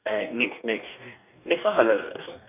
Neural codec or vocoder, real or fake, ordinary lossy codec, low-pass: codec, 44.1 kHz, 2.6 kbps, DAC; fake; none; 3.6 kHz